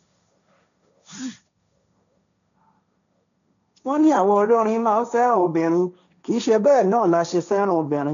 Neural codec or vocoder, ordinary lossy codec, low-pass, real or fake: codec, 16 kHz, 1.1 kbps, Voila-Tokenizer; none; 7.2 kHz; fake